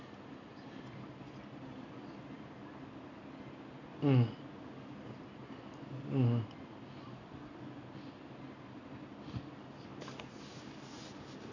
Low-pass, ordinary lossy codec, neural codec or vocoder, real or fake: 7.2 kHz; none; none; real